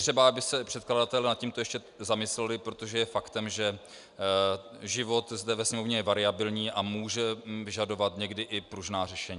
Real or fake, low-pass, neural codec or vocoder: real; 10.8 kHz; none